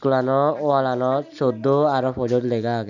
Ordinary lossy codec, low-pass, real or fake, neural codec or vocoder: none; 7.2 kHz; real; none